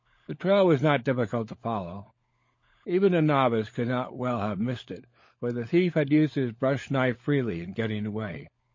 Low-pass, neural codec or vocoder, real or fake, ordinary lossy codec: 7.2 kHz; codec, 16 kHz, 16 kbps, FunCodec, trained on LibriTTS, 50 frames a second; fake; MP3, 32 kbps